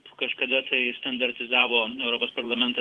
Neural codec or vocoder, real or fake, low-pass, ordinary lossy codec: vocoder, 24 kHz, 100 mel bands, Vocos; fake; 10.8 kHz; Opus, 16 kbps